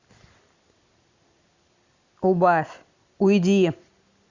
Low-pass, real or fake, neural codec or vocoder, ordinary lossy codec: 7.2 kHz; real; none; Opus, 64 kbps